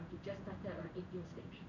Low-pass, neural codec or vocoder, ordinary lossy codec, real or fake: 7.2 kHz; codec, 16 kHz in and 24 kHz out, 1 kbps, XY-Tokenizer; none; fake